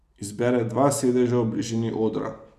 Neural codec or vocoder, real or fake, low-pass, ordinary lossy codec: none; real; 14.4 kHz; none